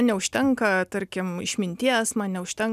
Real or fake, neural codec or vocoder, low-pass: real; none; 14.4 kHz